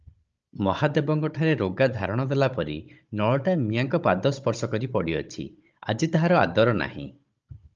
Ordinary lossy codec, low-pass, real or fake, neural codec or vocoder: Opus, 24 kbps; 7.2 kHz; fake; codec, 16 kHz, 16 kbps, FunCodec, trained on Chinese and English, 50 frames a second